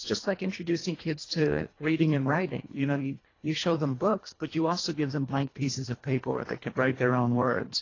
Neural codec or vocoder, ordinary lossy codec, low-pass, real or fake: codec, 24 kHz, 1.5 kbps, HILCodec; AAC, 32 kbps; 7.2 kHz; fake